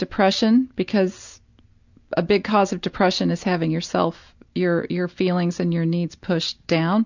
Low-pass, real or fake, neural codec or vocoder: 7.2 kHz; real; none